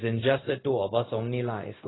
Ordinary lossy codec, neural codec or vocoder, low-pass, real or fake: AAC, 16 kbps; codec, 16 kHz, 0.4 kbps, LongCat-Audio-Codec; 7.2 kHz; fake